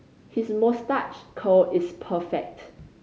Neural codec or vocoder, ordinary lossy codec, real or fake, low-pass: none; none; real; none